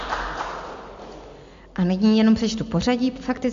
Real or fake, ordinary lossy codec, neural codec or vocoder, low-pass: real; MP3, 48 kbps; none; 7.2 kHz